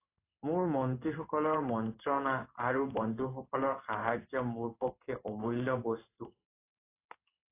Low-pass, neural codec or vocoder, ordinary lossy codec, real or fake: 3.6 kHz; codec, 16 kHz in and 24 kHz out, 1 kbps, XY-Tokenizer; AAC, 24 kbps; fake